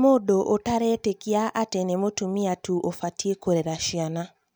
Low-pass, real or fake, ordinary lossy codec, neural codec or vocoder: none; real; none; none